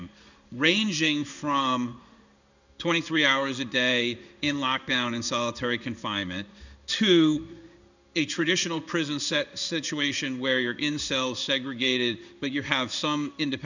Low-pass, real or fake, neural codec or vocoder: 7.2 kHz; fake; codec, 16 kHz in and 24 kHz out, 1 kbps, XY-Tokenizer